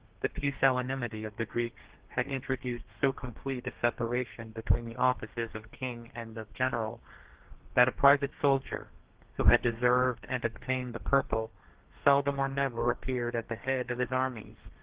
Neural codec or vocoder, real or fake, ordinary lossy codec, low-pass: codec, 32 kHz, 1.9 kbps, SNAC; fake; Opus, 16 kbps; 3.6 kHz